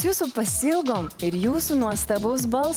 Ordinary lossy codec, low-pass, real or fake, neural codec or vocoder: Opus, 32 kbps; 19.8 kHz; fake; vocoder, 44.1 kHz, 128 mel bands every 512 samples, BigVGAN v2